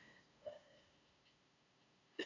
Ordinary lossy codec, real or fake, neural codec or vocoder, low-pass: none; fake; codec, 16 kHz, 2 kbps, FunCodec, trained on LibriTTS, 25 frames a second; 7.2 kHz